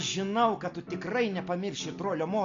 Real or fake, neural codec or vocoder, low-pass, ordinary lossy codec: real; none; 7.2 kHz; AAC, 48 kbps